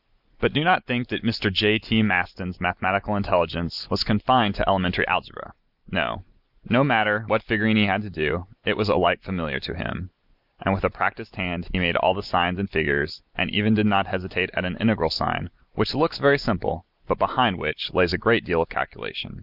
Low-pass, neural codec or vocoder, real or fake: 5.4 kHz; none; real